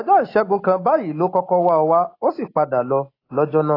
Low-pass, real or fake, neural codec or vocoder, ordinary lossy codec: 5.4 kHz; real; none; AAC, 24 kbps